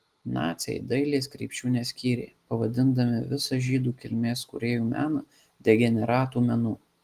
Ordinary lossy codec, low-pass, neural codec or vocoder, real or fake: Opus, 24 kbps; 14.4 kHz; none; real